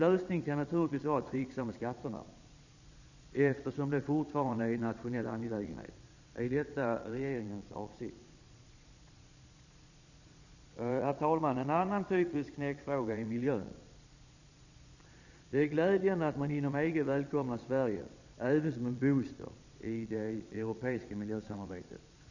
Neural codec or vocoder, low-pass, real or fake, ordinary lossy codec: vocoder, 22.05 kHz, 80 mel bands, Vocos; 7.2 kHz; fake; none